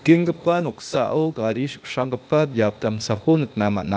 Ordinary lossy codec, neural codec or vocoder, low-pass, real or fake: none; codec, 16 kHz, 0.8 kbps, ZipCodec; none; fake